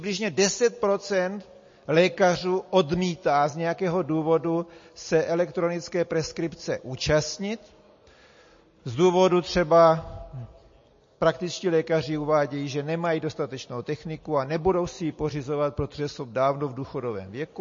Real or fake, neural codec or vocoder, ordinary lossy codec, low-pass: real; none; MP3, 32 kbps; 7.2 kHz